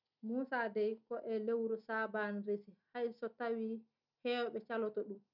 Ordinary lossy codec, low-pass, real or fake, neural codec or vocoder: none; 5.4 kHz; real; none